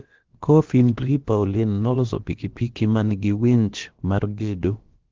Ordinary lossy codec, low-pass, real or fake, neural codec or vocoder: Opus, 16 kbps; 7.2 kHz; fake; codec, 16 kHz, about 1 kbps, DyCAST, with the encoder's durations